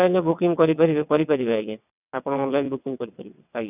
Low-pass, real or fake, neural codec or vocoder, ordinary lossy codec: 3.6 kHz; fake; vocoder, 22.05 kHz, 80 mel bands, WaveNeXt; none